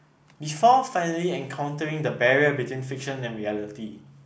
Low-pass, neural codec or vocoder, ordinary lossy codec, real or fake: none; none; none; real